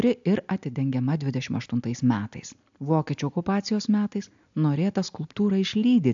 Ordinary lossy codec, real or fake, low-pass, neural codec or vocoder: AAC, 64 kbps; real; 7.2 kHz; none